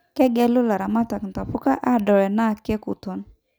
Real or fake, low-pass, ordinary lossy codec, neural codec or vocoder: real; none; none; none